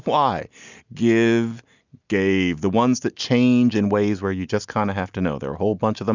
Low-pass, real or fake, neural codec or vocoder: 7.2 kHz; real; none